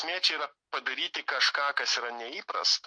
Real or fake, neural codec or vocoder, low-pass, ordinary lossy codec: real; none; 9.9 kHz; MP3, 48 kbps